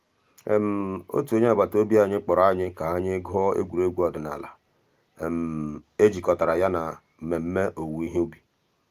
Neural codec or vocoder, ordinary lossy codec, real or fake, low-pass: none; Opus, 32 kbps; real; 14.4 kHz